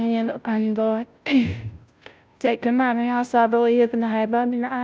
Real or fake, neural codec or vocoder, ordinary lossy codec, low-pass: fake; codec, 16 kHz, 0.5 kbps, FunCodec, trained on Chinese and English, 25 frames a second; none; none